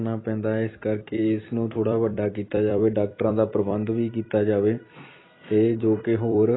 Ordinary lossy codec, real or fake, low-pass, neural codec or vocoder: AAC, 16 kbps; fake; 7.2 kHz; vocoder, 44.1 kHz, 128 mel bands every 256 samples, BigVGAN v2